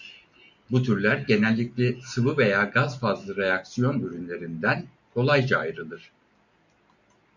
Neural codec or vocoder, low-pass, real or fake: none; 7.2 kHz; real